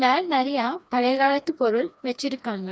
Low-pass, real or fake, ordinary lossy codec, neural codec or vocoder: none; fake; none; codec, 16 kHz, 2 kbps, FreqCodec, smaller model